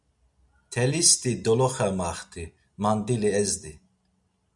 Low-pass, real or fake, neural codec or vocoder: 10.8 kHz; real; none